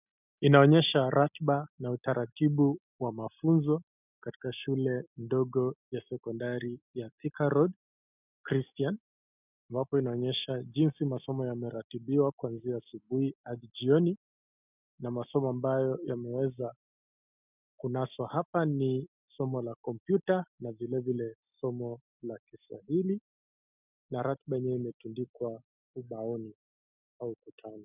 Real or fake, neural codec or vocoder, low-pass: real; none; 3.6 kHz